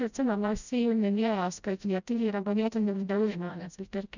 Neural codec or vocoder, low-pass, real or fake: codec, 16 kHz, 0.5 kbps, FreqCodec, smaller model; 7.2 kHz; fake